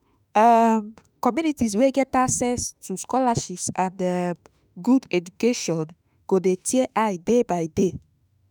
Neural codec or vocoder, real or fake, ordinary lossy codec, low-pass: autoencoder, 48 kHz, 32 numbers a frame, DAC-VAE, trained on Japanese speech; fake; none; none